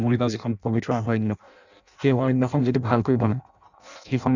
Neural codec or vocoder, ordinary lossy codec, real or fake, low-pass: codec, 16 kHz in and 24 kHz out, 0.6 kbps, FireRedTTS-2 codec; none; fake; 7.2 kHz